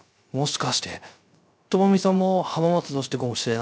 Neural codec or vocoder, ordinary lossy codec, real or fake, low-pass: codec, 16 kHz, 0.3 kbps, FocalCodec; none; fake; none